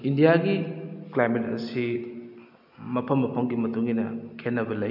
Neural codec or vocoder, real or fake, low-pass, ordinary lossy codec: none; real; 5.4 kHz; none